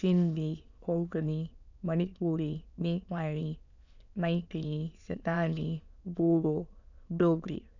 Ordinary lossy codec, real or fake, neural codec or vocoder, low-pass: none; fake; autoencoder, 22.05 kHz, a latent of 192 numbers a frame, VITS, trained on many speakers; 7.2 kHz